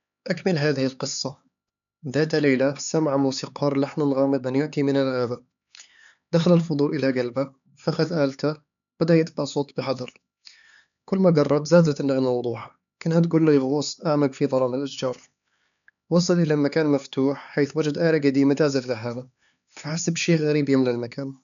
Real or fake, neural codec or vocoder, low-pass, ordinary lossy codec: fake; codec, 16 kHz, 4 kbps, X-Codec, HuBERT features, trained on LibriSpeech; 7.2 kHz; none